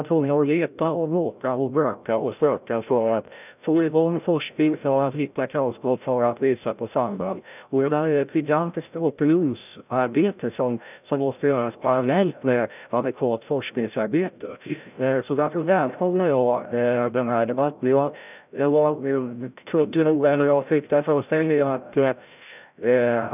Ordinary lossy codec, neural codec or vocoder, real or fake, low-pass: none; codec, 16 kHz, 0.5 kbps, FreqCodec, larger model; fake; 3.6 kHz